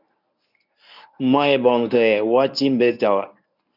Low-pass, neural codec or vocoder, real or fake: 5.4 kHz; codec, 24 kHz, 0.9 kbps, WavTokenizer, medium speech release version 1; fake